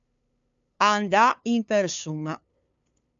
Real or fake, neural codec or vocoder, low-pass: fake; codec, 16 kHz, 2 kbps, FunCodec, trained on LibriTTS, 25 frames a second; 7.2 kHz